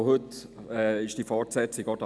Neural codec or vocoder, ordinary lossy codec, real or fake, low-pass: none; none; real; none